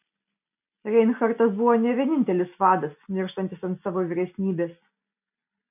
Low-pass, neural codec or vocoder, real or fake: 3.6 kHz; none; real